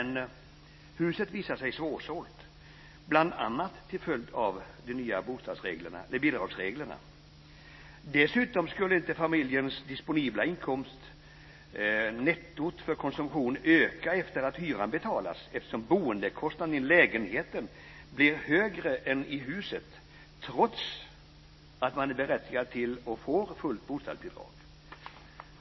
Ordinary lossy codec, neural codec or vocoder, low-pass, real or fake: MP3, 24 kbps; none; 7.2 kHz; real